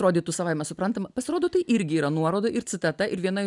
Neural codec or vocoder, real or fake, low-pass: none; real; 10.8 kHz